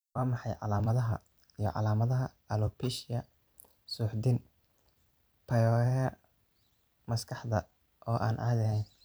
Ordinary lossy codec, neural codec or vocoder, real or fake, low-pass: none; vocoder, 44.1 kHz, 128 mel bands every 256 samples, BigVGAN v2; fake; none